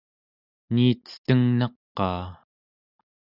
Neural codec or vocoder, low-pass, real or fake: none; 5.4 kHz; real